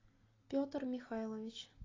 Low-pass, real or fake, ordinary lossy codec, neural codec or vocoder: 7.2 kHz; real; AAC, 32 kbps; none